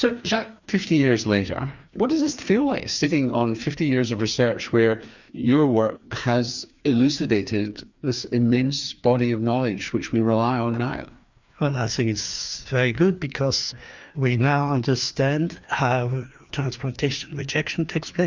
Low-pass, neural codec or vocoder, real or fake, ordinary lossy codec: 7.2 kHz; codec, 16 kHz, 2 kbps, FreqCodec, larger model; fake; Opus, 64 kbps